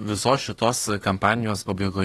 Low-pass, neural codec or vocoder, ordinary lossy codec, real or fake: 19.8 kHz; autoencoder, 48 kHz, 32 numbers a frame, DAC-VAE, trained on Japanese speech; AAC, 32 kbps; fake